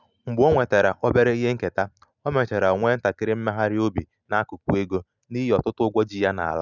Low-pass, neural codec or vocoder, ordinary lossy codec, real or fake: 7.2 kHz; none; none; real